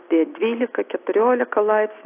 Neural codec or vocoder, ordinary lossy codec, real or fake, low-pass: none; AAC, 24 kbps; real; 3.6 kHz